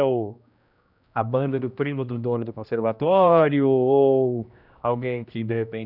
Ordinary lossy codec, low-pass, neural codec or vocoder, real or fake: none; 5.4 kHz; codec, 16 kHz, 1 kbps, X-Codec, HuBERT features, trained on general audio; fake